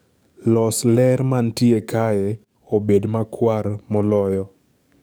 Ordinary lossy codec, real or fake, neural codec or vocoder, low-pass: none; fake; codec, 44.1 kHz, 7.8 kbps, DAC; none